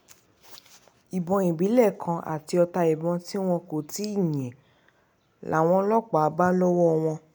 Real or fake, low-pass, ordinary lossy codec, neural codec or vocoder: real; none; none; none